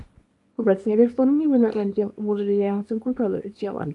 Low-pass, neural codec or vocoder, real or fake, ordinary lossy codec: 10.8 kHz; codec, 24 kHz, 0.9 kbps, WavTokenizer, small release; fake; AAC, 64 kbps